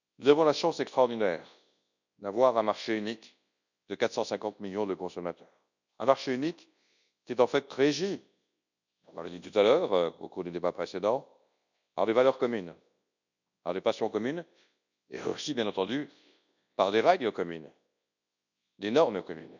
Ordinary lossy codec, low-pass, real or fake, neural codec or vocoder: none; 7.2 kHz; fake; codec, 24 kHz, 0.9 kbps, WavTokenizer, large speech release